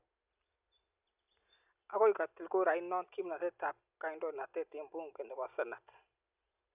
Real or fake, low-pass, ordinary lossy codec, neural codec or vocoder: real; 3.6 kHz; none; none